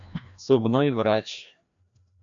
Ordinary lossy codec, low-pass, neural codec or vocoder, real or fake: AAC, 64 kbps; 7.2 kHz; codec, 16 kHz, 1 kbps, FreqCodec, larger model; fake